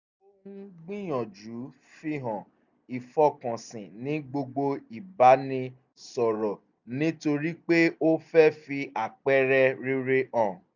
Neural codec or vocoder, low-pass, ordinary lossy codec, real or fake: none; 7.2 kHz; none; real